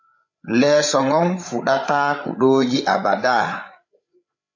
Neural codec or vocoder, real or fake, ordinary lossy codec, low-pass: codec, 16 kHz, 8 kbps, FreqCodec, larger model; fake; AAC, 48 kbps; 7.2 kHz